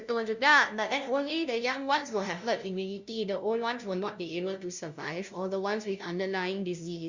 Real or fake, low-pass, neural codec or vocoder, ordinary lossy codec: fake; 7.2 kHz; codec, 16 kHz, 0.5 kbps, FunCodec, trained on LibriTTS, 25 frames a second; Opus, 64 kbps